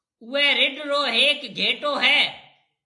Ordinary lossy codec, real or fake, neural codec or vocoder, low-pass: AAC, 64 kbps; real; none; 10.8 kHz